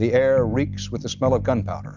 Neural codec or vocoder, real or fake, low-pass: none; real; 7.2 kHz